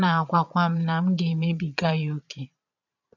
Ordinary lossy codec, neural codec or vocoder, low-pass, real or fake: none; vocoder, 22.05 kHz, 80 mel bands, Vocos; 7.2 kHz; fake